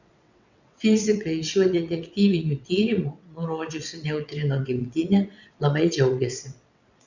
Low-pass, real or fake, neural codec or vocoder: 7.2 kHz; fake; vocoder, 22.05 kHz, 80 mel bands, WaveNeXt